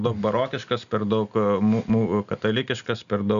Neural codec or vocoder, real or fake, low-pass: none; real; 7.2 kHz